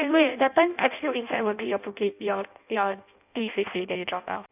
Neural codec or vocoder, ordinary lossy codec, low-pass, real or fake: codec, 16 kHz in and 24 kHz out, 0.6 kbps, FireRedTTS-2 codec; none; 3.6 kHz; fake